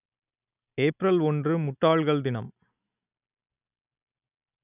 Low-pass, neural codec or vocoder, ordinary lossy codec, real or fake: 3.6 kHz; none; none; real